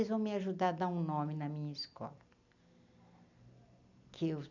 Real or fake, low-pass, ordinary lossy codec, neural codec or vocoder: real; 7.2 kHz; none; none